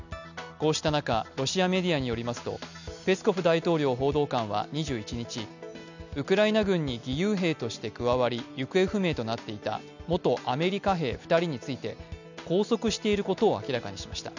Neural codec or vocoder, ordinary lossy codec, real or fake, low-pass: none; none; real; 7.2 kHz